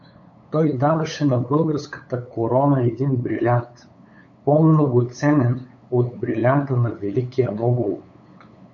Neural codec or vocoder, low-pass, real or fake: codec, 16 kHz, 8 kbps, FunCodec, trained on LibriTTS, 25 frames a second; 7.2 kHz; fake